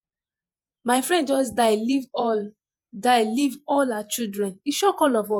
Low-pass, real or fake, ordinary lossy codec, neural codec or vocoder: none; fake; none; vocoder, 48 kHz, 128 mel bands, Vocos